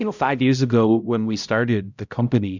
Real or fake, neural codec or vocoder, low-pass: fake; codec, 16 kHz, 0.5 kbps, X-Codec, HuBERT features, trained on balanced general audio; 7.2 kHz